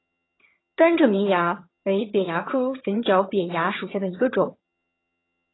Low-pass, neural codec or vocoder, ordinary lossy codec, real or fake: 7.2 kHz; vocoder, 22.05 kHz, 80 mel bands, HiFi-GAN; AAC, 16 kbps; fake